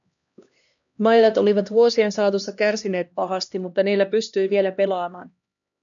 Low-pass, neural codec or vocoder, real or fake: 7.2 kHz; codec, 16 kHz, 1 kbps, X-Codec, HuBERT features, trained on LibriSpeech; fake